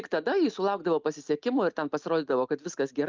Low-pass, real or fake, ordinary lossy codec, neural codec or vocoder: 7.2 kHz; real; Opus, 24 kbps; none